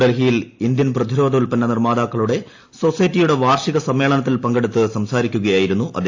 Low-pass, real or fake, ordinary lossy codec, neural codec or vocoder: 7.2 kHz; real; AAC, 48 kbps; none